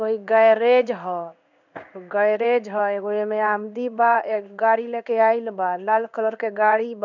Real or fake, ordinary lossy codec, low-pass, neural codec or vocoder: fake; none; 7.2 kHz; codec, 16 kHz in and 24 kHz out, 1 kbps, XY-Tokenizer